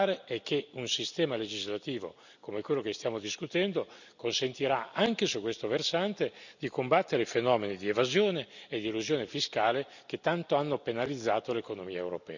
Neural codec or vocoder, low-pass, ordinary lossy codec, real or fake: none; 7.2 kHz; none; real